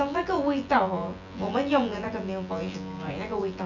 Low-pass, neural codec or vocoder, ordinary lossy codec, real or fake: 7.2 kHz; vocoder, 24 kHz, 100 mel bands, Vocos; none; fake